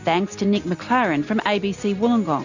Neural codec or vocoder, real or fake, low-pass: none; real; 7.2 kHz